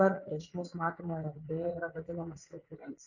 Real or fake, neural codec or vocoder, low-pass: fake; vocoder, 44.1 kHz, 80 mel bands, Vocos; 7.2 kHz